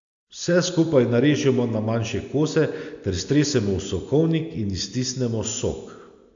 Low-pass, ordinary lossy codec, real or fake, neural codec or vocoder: 7.2 kHz; AAC, 64 kbps; real; none